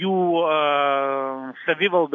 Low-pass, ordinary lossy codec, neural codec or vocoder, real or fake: 7.2 kHz; AAC, 48 kbps; none; real